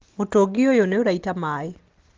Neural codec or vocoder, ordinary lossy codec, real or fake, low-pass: none; Opus, 16 kbps; real; 7.2 kHz